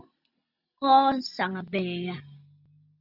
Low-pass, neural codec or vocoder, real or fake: 5.4 kHz; none; real